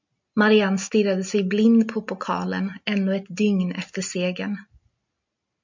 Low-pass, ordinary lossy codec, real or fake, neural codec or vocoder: 7.2 kHz; MP3, 64 kbps; real; none